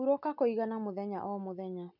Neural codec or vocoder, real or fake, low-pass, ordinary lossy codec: none; real; 5.4 kHz; none